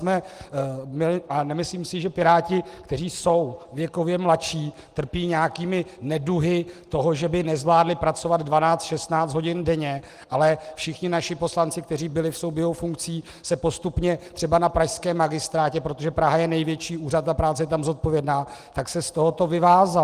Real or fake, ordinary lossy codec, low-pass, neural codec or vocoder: real; Opus, 24 kbps; 14.4 kHz; none